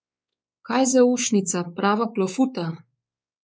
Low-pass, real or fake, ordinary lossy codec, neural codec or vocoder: none; fake; none; codec, 16 kHz, 4 kbps, X-Codec, WavLM features, trained on Multilingual LibriSpeech